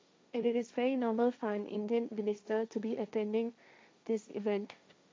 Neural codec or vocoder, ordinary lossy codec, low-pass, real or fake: codec, 16 kHz, 1.1 kbps, Voila-Tokenizer; none; none; fake